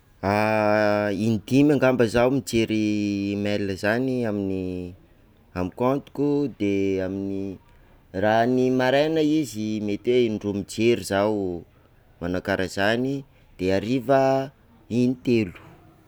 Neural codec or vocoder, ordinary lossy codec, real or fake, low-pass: none; none; real; none